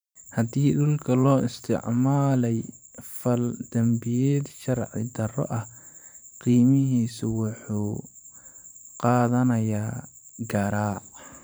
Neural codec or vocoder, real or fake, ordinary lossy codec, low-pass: vocoder, 44.1 kHz, 128 mel bands every 512 samples, BigVGAN v2; fake; none; none